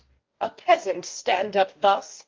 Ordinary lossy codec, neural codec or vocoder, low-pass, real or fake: Opus, 32 kbps; codec, 44.1 kHz, 2.6 kbps, DAC; 7.2 kHz; fake